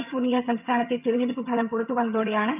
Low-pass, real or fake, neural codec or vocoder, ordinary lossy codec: 3.6 kHz; fake; vocoder, 22.05 kHz, 80 mel bands, HiFi-GAN; none